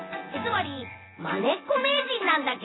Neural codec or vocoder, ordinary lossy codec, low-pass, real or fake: vocoder, 24 kHz, 100 mel bands, Vocos; AAC, 16 kbps; 7.2 kHz; fake